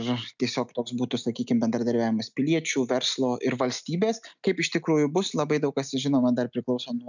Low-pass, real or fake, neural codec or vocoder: 7.2 kHz; real; none